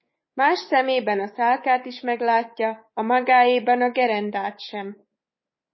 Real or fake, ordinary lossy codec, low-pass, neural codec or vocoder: fake; MP3, 24 kbps; 7.2 kHz; codec, 24 kHz, 3.1 kbps, DualCodec